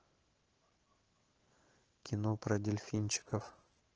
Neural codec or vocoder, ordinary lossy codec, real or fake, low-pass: autoencoder, 48 kHz, 128 numbers a frame, DAC-VAE, trained on Japanese speech; Opus, 16 kbps; fake; 7.2 kHz